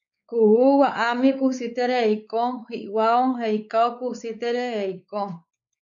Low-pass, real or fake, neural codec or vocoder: 7.2 kHz; fake; codec, 16 kHz, 4 kbps, X-Codec, WavLM features, trained on Multilingual LibriSpeech